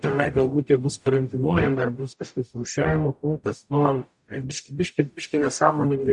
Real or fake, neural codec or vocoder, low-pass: fake; codec, 44.1 kHz, 0.9 kbps, DAC; 10.8 kHz